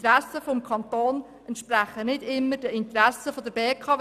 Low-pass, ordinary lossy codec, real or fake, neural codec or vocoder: 14.4 kHz; none; real; none